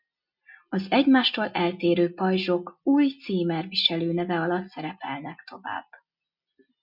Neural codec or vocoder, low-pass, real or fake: none; 5.4 kHz; real